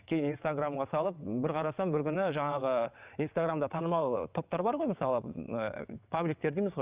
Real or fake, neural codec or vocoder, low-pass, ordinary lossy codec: fake; vocoder, 22.05 kHz, 80 mel bands, WaveNeXt; 3.6 kHz; Opus, 64 kbps